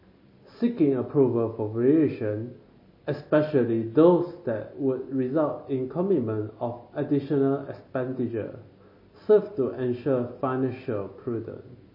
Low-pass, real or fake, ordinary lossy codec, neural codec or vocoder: 5.4 kHz; real; MP3, 24 kbps; none